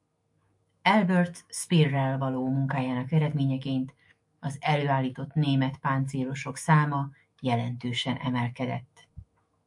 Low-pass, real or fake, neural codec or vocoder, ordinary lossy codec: 10.8 kHz; fake; autoencoder, 48 kHz, 128 numbers a frame, DAC-VAE, trained on Japanese speech; MP3, 64 kbps